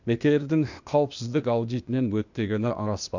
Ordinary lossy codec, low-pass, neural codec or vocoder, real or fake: none; 7.2 kHz; codec, 16 kHz, 0.8 kbps, ZipCodec; fake